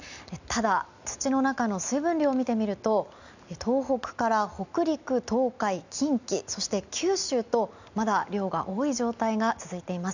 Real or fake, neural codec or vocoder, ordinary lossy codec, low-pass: real; none; none; 7.2 kHz